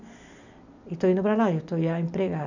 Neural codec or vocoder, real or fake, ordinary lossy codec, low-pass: none; real; none; 7.2 kHz